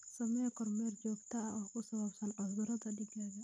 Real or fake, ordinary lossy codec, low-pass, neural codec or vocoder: real; none; none; none